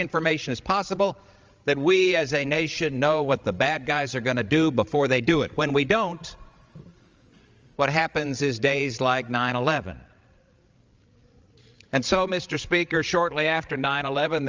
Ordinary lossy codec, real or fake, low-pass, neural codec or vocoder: Opus, 32 kbps; fake; 7.2 kHz; codec, 16 kHz, 16 kbps, FreqCodec, larger model